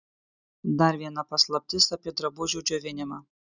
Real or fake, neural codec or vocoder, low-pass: real; none; 7.2 kHz